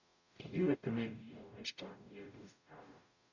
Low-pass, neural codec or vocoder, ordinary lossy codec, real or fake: 7.2 kHz; codec, 44.1 kHz, 0.9 kbps, DAC; none; fake